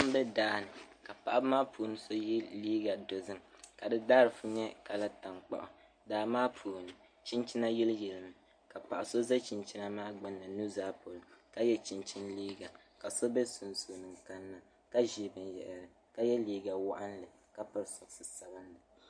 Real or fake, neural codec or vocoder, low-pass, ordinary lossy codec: real; none; 9.9 kHz; MP3, 48 kbps